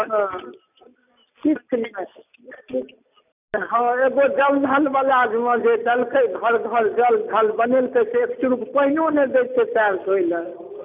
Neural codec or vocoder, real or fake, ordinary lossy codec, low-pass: none; real; none; 3.6 kHz